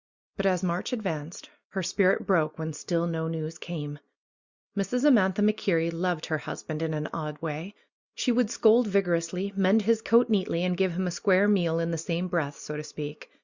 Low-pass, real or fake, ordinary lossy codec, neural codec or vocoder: 7.2 kHz; real; Opus, 64 kbps; none